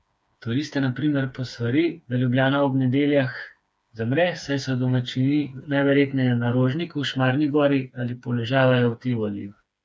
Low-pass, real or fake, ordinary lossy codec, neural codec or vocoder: none; fake; none; codec, 16 kHz, 4 kbps, FreqCodec, smaller model